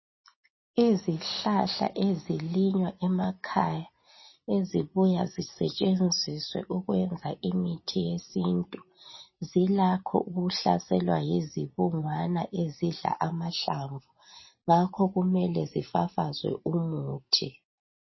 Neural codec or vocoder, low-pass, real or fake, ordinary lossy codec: none; 7.2 kHz; real; MP3, 24 kbps